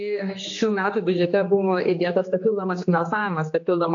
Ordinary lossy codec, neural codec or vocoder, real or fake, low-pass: AAC, 48 kbps; codec, 16 kHz, 2 kbps, X-Codec, HuBERT features, trained on general audio; fake; 7.2 kHz